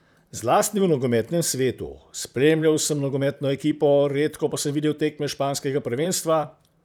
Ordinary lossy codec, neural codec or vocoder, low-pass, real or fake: none; vocoder, 44.1 kHz, 128 mel bands, Pupu-Vocoder; none; fake